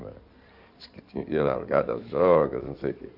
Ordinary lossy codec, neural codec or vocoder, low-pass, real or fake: none; codec, 16 kHz in and 24 kHz out, 2.2 kbps, FireRedTTS-2 codec; 5.4 kHz; fake